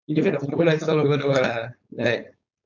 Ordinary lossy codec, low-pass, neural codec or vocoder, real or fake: none; 7.2 kHz; codec, 16 kHz, 4.8 kbps, FACodec; fake